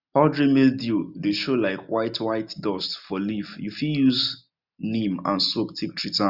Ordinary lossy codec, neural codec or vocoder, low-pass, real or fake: none; none; 5.4 kHz; real